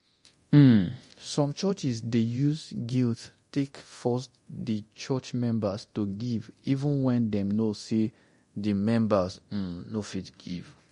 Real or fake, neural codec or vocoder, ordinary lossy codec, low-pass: fake; codec, 24 kHz, 0.9 kbps, DualCodec; MP3, 48 kbps; 10.8 kHz